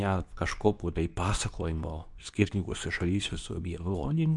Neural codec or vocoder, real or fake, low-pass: codec, 24 kHz, 0.9 kbps, WavTokenizer, medium speech release version 2; fake; 10.8 kHz